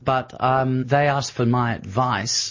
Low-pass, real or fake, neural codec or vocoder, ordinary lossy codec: 7.2 kHz; real; none; MP3, 32 kbps